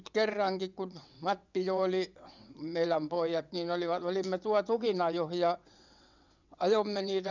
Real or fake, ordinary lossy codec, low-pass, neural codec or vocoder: fake; none; 7.2 kHz; vocoder, 22.05 kHz, 80 mel bands, WaveNeXt